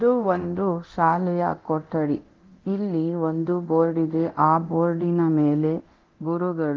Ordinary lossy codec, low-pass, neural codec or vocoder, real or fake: Opus, 16 kbps; 7.2 kHz; codec, 24 kHz, 0.9 kbps, DualCodec; fake